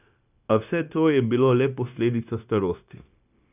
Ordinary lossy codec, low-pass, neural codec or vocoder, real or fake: none; 3.6 kHz; codec, 16 kHz, 0.9 kbps, LongCat-Audio-Codec; fake